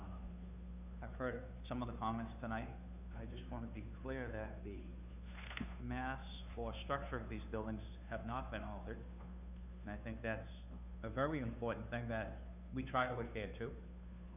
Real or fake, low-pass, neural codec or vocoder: fake; 3.6 kHz; codec, 16 kHz, 2 kbps, FunCodec, trained on Chinese and English, 25 frames a second